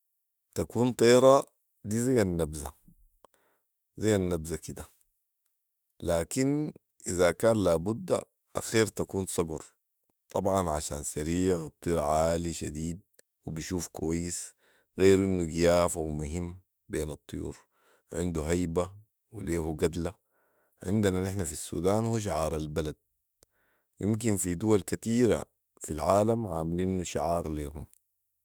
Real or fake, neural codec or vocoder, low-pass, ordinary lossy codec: fake; autoencoder, 48 kHz, 32 numbers a frame, DAC-VAE, trained on Japanese speech; none; none